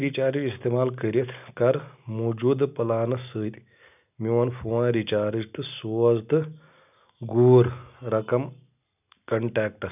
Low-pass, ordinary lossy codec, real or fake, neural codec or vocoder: 3.6 kHz; none; real; none